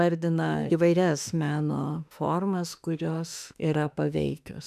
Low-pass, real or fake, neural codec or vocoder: 14.4 kHz; fake; autoencoder, 48 kHz, 32 numbers a frame, DAC-VAE, trained on Japanese speech